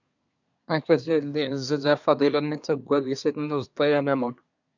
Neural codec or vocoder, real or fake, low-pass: codec, 24 kHz, 1 kbps, SNAC; fake; 7.2 kHz